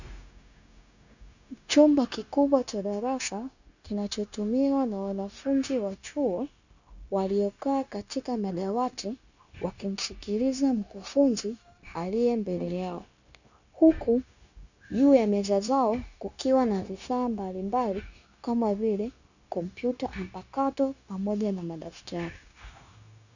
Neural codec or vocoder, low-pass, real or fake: codec, 16 kHz, 0.9 kbps, LongCat-Audio-Codec; 7.2 kHz; fake